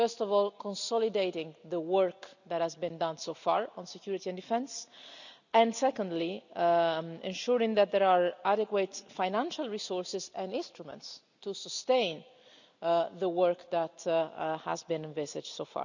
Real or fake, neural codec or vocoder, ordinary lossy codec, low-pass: real; none; none; 7.2 kHz